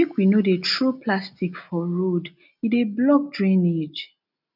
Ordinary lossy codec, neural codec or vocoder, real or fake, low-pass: none; none; real; 5.4 kHz